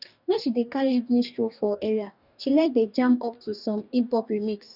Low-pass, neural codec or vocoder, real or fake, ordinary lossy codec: 5.4 kHz; codec, 44.1 kHz, 2.6 kbps, DAC; fake; none